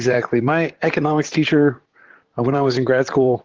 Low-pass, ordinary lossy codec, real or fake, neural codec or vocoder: 7.2 kHz; Opus, 16 kbps; fake; vocoder, 44.1 kHz, 128 mel bands, Pupu-Vocoder